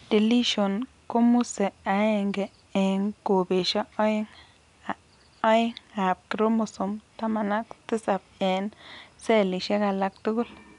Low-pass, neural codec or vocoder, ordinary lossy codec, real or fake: 10.8 kHz; none; none; real